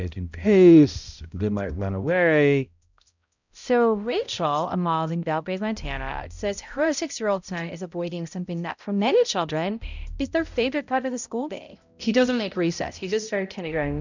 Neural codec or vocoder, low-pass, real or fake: codec, 16 kHz, 0.5 kbps, X-Codec, HuBERT features, trained on balanced general audio; 7.2 kHz; fake